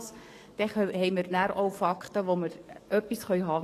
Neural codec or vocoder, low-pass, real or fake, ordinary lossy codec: codec, 44.1 kHz, 7.8 kbps, DAC; 14.4 kHz; fake; AAC, 48 kbps